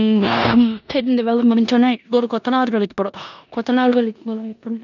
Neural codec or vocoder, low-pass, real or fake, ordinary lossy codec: codec, 16 kHz in and 24 kHz out, 0.9 kbps, LongCat-Audio-Codec, four codebook decoder; 7.2 kHz; fake; none